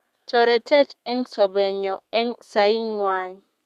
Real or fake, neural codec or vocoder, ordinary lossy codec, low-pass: fake; codec, 32 kHz, 1.9 kbps, SNAC; Opus, 64 kbps; 14.4 kHz